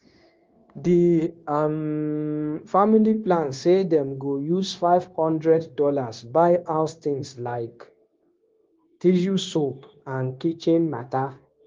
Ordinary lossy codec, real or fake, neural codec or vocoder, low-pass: Opus, 32 kbps; fake; codec, 16 kHz, 0.9 kbps, LongCat-Audio-Codec; 7.2 kHz